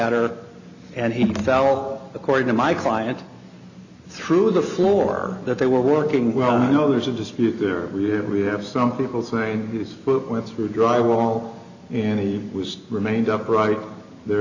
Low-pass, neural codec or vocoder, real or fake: 7.2 kHz; none; real